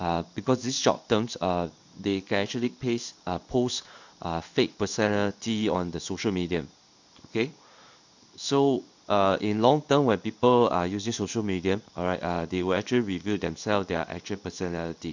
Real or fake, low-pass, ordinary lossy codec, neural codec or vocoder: fake; 7.2 kHz; none; codec, 16 kHz in and 24 kHz out, 1 kbps, XY-Tokenizer